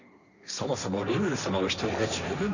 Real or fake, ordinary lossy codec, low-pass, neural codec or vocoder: fake; none; 7.2 kHz; codec, 16 kHz, 1.1 kbps, Voila-Tokenizer